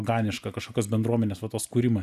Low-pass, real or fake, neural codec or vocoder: 14.4 kHz; real; none